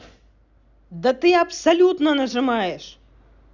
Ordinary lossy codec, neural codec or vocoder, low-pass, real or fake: none; none; 7.2 kHz; real